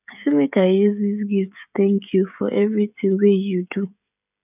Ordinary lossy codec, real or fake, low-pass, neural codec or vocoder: none; fake; 3.6 kHz; codec, 16 kHz, 16 kbps, FreqCodec, smaller model